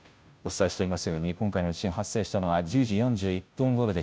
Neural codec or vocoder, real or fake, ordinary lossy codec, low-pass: codec, 16 kHz, 0.5 kbps, FunCodec, trained on Chinese and English, 25 frames a second; fake; none; none